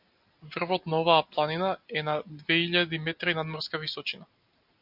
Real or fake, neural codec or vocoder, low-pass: real; none; 5.4 kHz